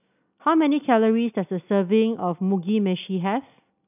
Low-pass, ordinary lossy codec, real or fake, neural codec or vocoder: 3.6 kHz; none; real; none